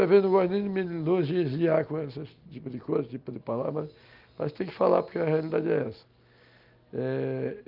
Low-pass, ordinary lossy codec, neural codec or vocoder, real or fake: 5.4 kHz; Opus, 24 kbps; none; real